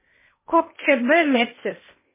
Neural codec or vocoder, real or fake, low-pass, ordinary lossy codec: codec, 16 kHz in and 24 kHz out, 0.6 kbps, FocalCodec, streaming, 2048 codes; fake; 3.6 kHz; MP3, 16 kbps